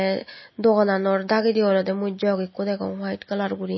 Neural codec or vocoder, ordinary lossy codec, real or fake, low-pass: none; MP3, 24 kbps; real; 7.2 kHz